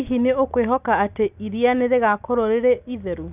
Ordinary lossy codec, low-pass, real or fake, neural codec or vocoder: none; 3.6 kHz; real; none